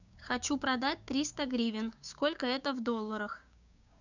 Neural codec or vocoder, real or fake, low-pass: codec, 16 kHz, 6 kbps, DAC; fake; 7.2 kHz